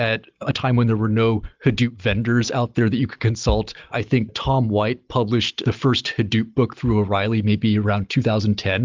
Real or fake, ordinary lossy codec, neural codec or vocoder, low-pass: real; Opus, 32 kbps; none; 7.2 kHz